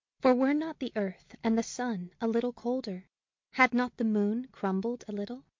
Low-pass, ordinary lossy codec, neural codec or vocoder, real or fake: 7.2 kHz; MP3, 48 kbps; none; real